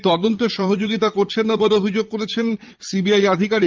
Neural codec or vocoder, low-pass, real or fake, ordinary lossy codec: vocoder, 44.1 kHz, 80 mel bands, Vocos; 7.2 kHz; fake; Opus, 32 kbps